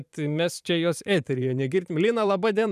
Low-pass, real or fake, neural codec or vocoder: 14.4 kHz; fake; vocoder, 44.1 kHz, 128 mel bands every 512 samples, BigVGAN v2